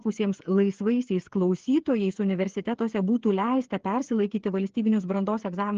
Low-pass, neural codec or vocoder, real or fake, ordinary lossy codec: 7.2 kHz; codec, 16 kHz, 8 kbps, FreqCodec, smaller model; fake; Opus, 16 kbps